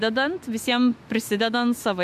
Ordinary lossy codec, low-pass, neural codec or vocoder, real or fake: MP3, 64 kbps; 14.4 kHz; autoencoder, 48 kHz, 128 numbers a frame, DAC-VAE, trained on Japanese speech; fake